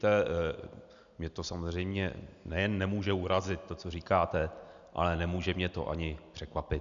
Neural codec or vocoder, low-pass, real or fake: none; 7.2 kHz; real